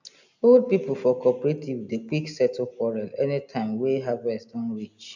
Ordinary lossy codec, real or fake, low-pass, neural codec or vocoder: none; real; 7.2 kHz; none